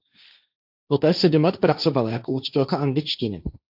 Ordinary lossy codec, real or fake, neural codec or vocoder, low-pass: AAC, 48 kbps; fake; codec, 16 kHz, 1.1 kbps, Voila-Tokenizer; 5.4 kHz